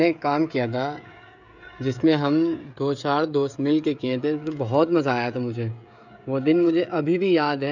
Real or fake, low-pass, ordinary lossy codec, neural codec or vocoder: fake; 7.2 kHz; none; codec, 16 kHz, 16 kbps, FreqCodec, smaller model